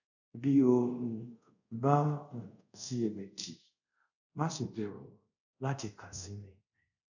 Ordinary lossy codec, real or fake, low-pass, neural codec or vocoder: none; fake; 7.2 kHz; codec, 24 kHz, 0.5 kbps, DualCodec